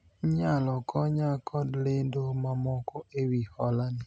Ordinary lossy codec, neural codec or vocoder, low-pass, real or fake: none; none; none; real